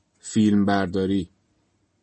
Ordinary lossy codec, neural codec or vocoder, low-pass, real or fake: MP3, 32 kbps; none; 10.8 kHz; real